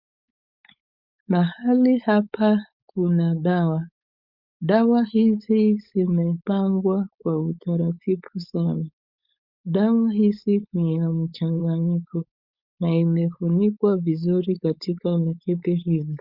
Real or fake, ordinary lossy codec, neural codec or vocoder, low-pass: fake; Opus, 64 kbps; codec, 16 kHz, 4.8 kbps, FACodec; 5.4 kHz